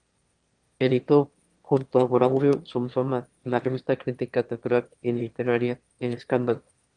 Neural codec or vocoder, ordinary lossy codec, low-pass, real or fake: autoencoder, 22.05 kHz, a latent of 192 numbers a frame, VITS, trained on one speaker; Opus, 24 kbps; 9.9 kHz; fake